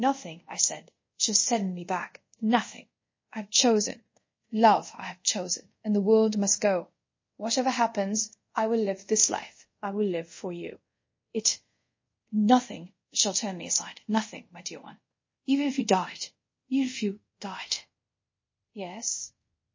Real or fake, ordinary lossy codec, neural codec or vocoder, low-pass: fake; MP3, 32 kbps; codec, 24 kHz, 0.5 kbps, DualCodec; 7.2 kHz